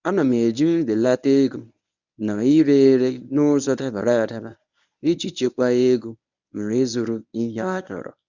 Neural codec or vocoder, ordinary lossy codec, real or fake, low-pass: codec, 24 kHz, 0.9 kbps, WavTokenizer, medium speech release version 1; none; fake; 7.2 kHz